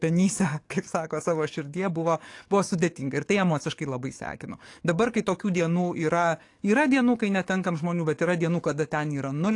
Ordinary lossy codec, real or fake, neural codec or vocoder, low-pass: AAC, 48 kbps; fake; codec, 44.1 kHz, 7.8 kbps, DAC; 10.8 kHz